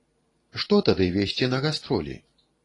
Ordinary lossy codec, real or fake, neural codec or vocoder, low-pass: AAC, 32 kbps; fake; vocoder, 44.1 kHz, 128 mel bands every 512 samples, BigVGAN v2; 10.8 kHz